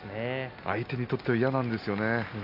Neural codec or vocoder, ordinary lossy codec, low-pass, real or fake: none; none; 5.4 kHz; real